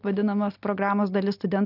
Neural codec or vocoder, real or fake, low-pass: none; real; 5.4 kHz